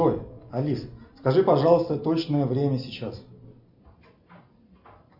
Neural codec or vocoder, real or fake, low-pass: none; real; 5.4 kHz